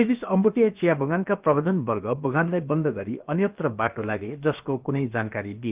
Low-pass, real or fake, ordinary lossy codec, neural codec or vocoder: 3.6 kHz; fake; Opus, 32 kbps; codec, 16 kHz, about 1 kbps, DyCAST, with the encoder's durations